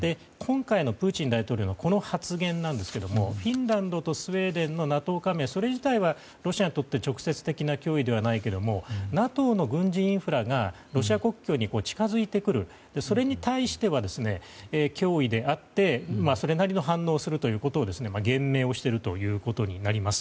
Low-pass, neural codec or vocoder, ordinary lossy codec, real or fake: none; none; none; real